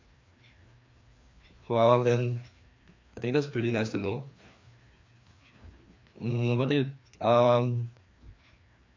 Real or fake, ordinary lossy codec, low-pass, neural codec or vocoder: fake; MP3, 48 kbps; 7.2 kHz; codec, 16 kHz, 2 kbps, FreqCodec, larger model